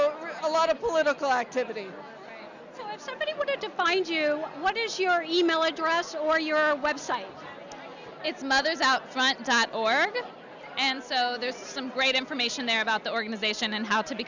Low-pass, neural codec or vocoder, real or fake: 7.2 kHz; none; real